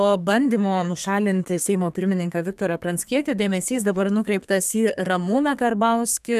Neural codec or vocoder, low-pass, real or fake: codec, 32 kHz, 1.9 kbps, SNAC; 14.4 kHz; fake